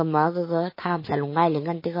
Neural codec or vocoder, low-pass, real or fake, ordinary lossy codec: autoencoder, 48 kHz, 32 numbers a frame, DAC-VAE, trained on Japanese speech; 5.4 kHz; fake; MP3, 24 kbps